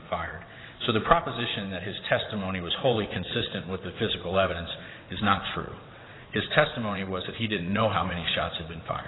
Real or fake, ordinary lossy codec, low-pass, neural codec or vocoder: fake; AAC, 16 kbps; 7.2 kHz; vocoder, 22.05 kHz, 80 mel bands, WaveNeXt